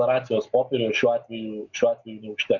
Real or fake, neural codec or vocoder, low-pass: real; none; 7.2 kHz